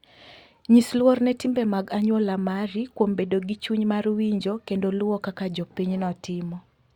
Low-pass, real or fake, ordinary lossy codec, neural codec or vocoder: 19.8 kHz; real; Opus, 64 kbps; none